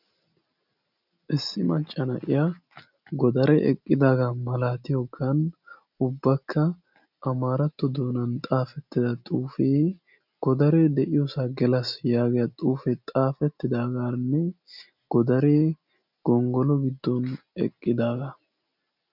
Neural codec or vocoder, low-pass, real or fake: none; 5.4 kHz; real